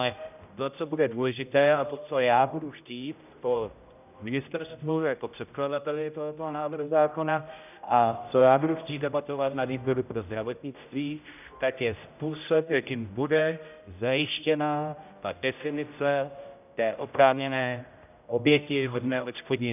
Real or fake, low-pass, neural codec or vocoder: fake; 3.6 kHz; codec, 16 kHz, 0.5 kbps, X-Codec, HuBERT features, trained on general audio